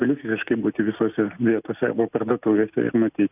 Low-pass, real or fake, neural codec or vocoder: 3.6 kHz; real; none